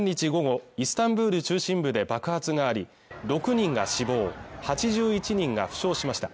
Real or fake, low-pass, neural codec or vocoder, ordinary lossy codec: real; none; none; none